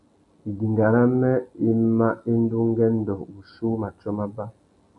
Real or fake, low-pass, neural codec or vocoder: real; 10.8 kHz; none